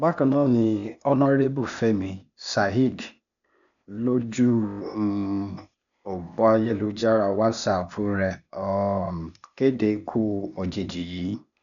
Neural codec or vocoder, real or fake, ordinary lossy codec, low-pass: codec, 16 kHz, 0.8 kbps, ZipCodec; fake; none; 7.2 kHz